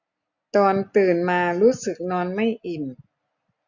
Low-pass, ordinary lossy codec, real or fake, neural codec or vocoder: 7.2 kHz; none; real; none